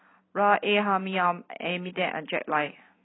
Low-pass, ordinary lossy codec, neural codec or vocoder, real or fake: 7.2 kHz; AAC, 16 kbps; none; real